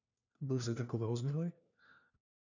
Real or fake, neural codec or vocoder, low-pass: fake; codec, 16 kHz, 1 kbps, FunCodec, trained on LibriTTS, 50 frames a second; 7.2 kHz